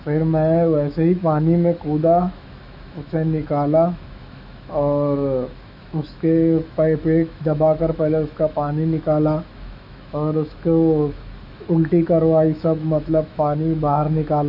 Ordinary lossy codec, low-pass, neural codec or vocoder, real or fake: none; 5.4 kHz; autoencoder, 48 kHz, 128 numbers a frame, DAC-VAE, trained on Japanese speech; fake